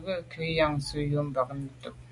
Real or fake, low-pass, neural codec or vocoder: real; 10.8 kHz; none